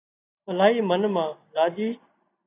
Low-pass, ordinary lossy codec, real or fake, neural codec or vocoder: 3.6 kHz; AAC, 16 kbps; real; none